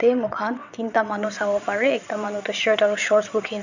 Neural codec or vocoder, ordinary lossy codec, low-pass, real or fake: vocoder, 22.05 kHz, 80 mel bands, WaveNeXt; none; 7.2 kHz; fake